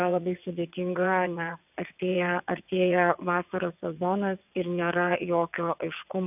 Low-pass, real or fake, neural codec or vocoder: 3.6 kHz; fake; vocoder, 22.05 kHz, 80 mel bands, WaveNeXt